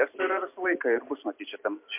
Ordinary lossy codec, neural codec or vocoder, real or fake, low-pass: MP3, 32 kbps; none; real; 3.6 kHz